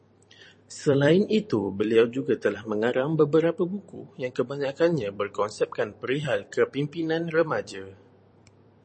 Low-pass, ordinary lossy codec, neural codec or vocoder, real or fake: 10.8 kHz; MP3, 32 kbps; vocoder, 44.1 kHz, 128 mel bands every 256 samples, BigVGAN v2; fake